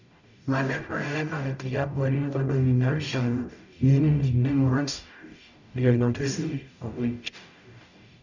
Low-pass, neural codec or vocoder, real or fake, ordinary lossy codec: 7.2 kHz; codec, 44.1 kHz, 0.9 kbps, DAC; fake; none